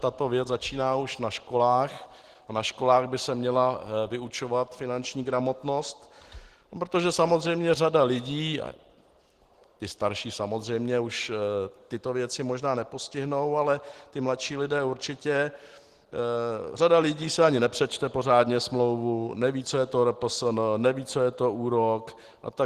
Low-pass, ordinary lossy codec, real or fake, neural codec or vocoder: 14.4 kHz; Opus, 16 kbps; real; none